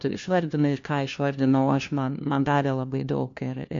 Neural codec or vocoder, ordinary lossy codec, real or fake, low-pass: codec, 16 kHz, 1 kbps, FunCodec, trained on LibriTTS, 50 frames a second; MP3, 48 kbps; fake; 7.2 kHz